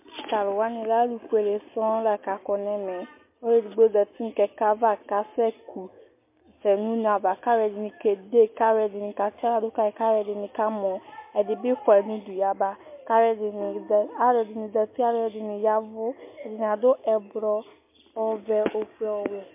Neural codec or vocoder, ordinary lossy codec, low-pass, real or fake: none; MP3, 24 kbps; 3.6 kHz; real